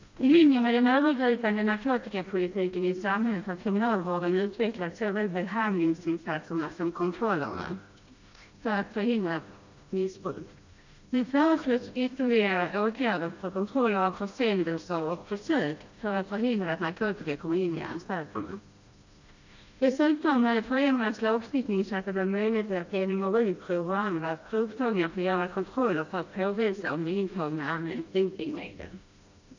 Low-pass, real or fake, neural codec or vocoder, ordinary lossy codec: 7.2 kHz; fake; codec, 16 kHz, 1 kbps, FreqCodec, smaller model; AAC, 48 kbps